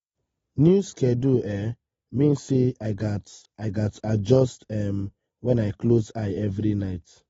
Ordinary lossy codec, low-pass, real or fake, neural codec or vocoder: AAC, 24 kbps; 19.8 kHz; real; none